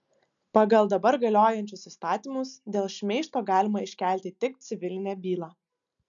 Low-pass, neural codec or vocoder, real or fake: 7.2 kHz; none; real